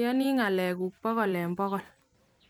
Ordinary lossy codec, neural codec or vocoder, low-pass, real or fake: none; vocoder, 44.1 kHz, 128 mel bands every 256 samples, BigVGAN v2; 19.8 kHz; fake